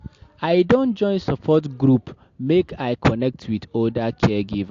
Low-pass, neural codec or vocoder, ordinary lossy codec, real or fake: 7.2 kHz; none; AAC, 48 kbps; real